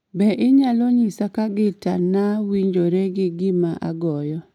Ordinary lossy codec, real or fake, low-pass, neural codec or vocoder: none; real; 19.8 kHz; none